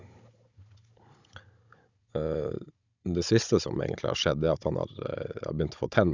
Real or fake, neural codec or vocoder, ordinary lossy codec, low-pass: fake; codec, 16 kHz, 16 kbps, FunCodec, trained on Chinese and English, 50 frames a second; Opus, 64 kbps; 7.2 kHz